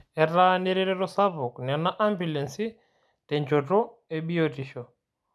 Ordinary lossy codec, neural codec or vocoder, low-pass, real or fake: none; none; none; real